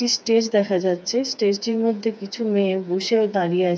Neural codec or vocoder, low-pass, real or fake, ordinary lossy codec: codec, 16 kHz, 4 kbps, FreqCodec, smaller model; none; fake; none